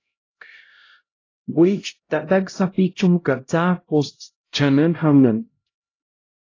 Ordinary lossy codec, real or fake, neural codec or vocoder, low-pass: AAC, 32 kbps; fake; codec, 16 kHz, 0.5 kbps, X-Codec, HuBERT features, trained on LibriSpeech; 7.2 kHz